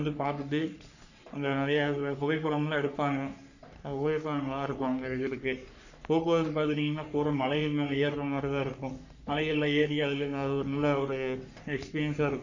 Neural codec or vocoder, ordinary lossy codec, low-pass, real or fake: codec, 44.1 kHz, 3.4 kbps, Pupu-Codec; none; 7.2 kHz; fake